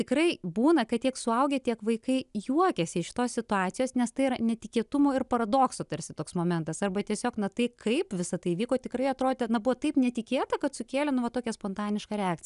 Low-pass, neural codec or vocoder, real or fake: 10.8 kHz; none; real